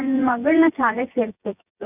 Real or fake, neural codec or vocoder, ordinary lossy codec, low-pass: fake; vocoder, 24 kHz, 100 mel bands, Vocos; none; 3.6 kHz